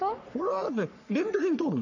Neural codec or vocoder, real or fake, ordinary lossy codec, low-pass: codec, 44.1 kHz, 3.4 kbps, Pupu-Codec; fake; none; 7.2 kHz